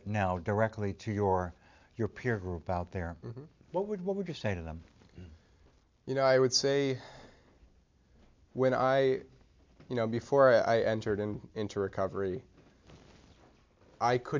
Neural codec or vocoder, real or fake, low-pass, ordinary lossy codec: none; real; 7.2 kHz; MP3, 64 kbps